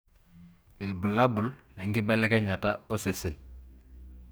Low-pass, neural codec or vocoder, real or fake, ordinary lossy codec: none; codec, 44.1 kHz, 2.6 kbps, DAC; fake; none